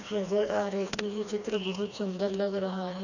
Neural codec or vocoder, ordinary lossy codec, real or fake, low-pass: codec, 16 kHz, 2 kbps, FreqCodec, smaller model; none; fake; 7.2 kHz